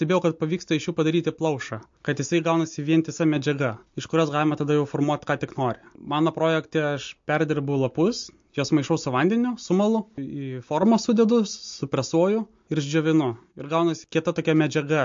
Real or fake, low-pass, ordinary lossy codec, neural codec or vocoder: real; 7.2 kHz; MP3, 48 kbps; none